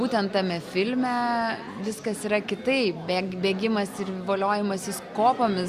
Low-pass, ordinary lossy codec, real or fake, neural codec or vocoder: 14.4 kHz; Opus, 64 kbps; real; none